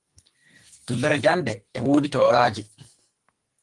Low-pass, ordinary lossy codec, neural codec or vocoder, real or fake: 10.8 kHz; Opus, 24 kbps; codec, 44.1 kHz, 2.6 kbps, SNAC; fake